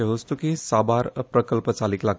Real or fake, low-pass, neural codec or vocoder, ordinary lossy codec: real; none; none; none